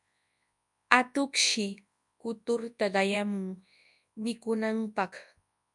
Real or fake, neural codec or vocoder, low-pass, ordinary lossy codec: fake; codec, 24 kHz, 0.9 kbps, WavTokenizer, large speech release; 10.8 kHz; MP3, 96 kbps